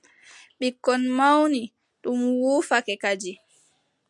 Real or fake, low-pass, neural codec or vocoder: real; 10.8 kHz; none